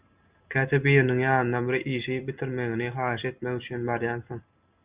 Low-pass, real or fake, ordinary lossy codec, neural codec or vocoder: 3.6 kHz; real; Opus, 64 kbps; none